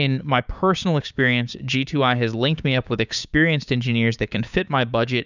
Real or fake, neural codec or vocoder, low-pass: fake; codec, 16 kHz, 6 kbps, DAC; 7.2 kHz